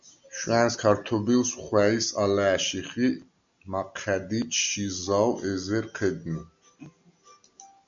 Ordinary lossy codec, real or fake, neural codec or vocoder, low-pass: MP3, 64 kbps; real; none; 7.2 kHz